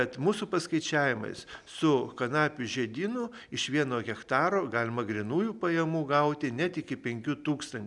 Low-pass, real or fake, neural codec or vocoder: 10.8 kHz; real; none